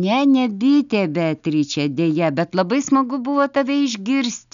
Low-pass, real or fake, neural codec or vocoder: 7.2 kHz; real; none